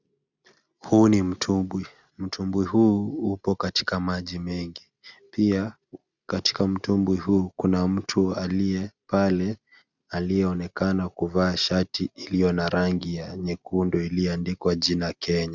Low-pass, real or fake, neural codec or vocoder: 7.2 kHz; real; none